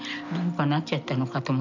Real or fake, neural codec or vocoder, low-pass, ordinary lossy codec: real; none; 7.2 kHz; none